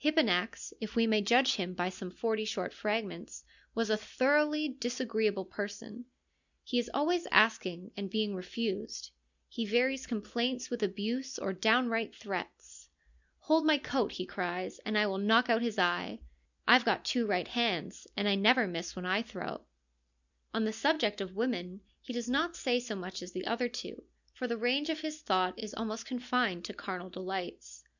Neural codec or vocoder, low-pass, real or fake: none; 7.2 kHz; real